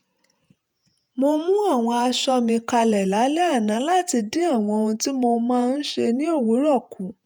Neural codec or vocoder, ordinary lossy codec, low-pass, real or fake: vocoder, 48 kHz, 128 mel bands, Vocos; none; none; fake